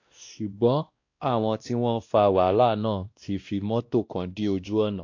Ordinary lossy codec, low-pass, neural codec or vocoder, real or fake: AAC, 48 kbps; 7.2 kHz; codec, 16 kHz, 1 kbps, X-Codec, WavLM features, trained on Multilingual LibriSpeech; fake